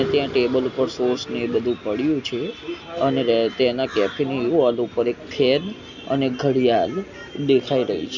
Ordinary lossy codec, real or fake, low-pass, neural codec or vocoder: none; real; 7.2 kHz; none